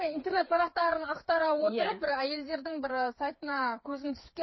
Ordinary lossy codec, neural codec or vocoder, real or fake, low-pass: MP3, 24 kbps; codec, 16 kHz, 4 kbps, FreqCodec, smaller model; fake; 7.2 kHz